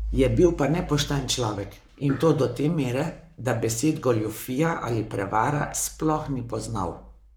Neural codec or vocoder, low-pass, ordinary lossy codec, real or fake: codec, 44.1 kHz, 7.8 kbps, Pupu-Codec; none; none; fake